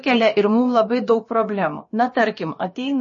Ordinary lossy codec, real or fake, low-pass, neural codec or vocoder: MP3, 32 kbps; fake; 7.2 kHz; codec, 16 kHz, about 1 kbps, DyCAST, with the encoder's durations